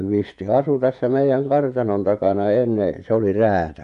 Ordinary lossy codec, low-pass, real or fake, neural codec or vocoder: none; 10.8 kHz; fake; vocoder, 24 kHz, 100 mel bands, Vocos